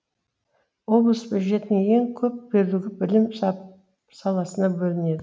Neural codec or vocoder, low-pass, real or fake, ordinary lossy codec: none; none; real; none